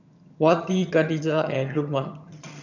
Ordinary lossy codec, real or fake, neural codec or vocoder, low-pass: none; fake; vocoder, 22.05 kHz, 80 mel bands, HiFi-GAN; 7.2 kHz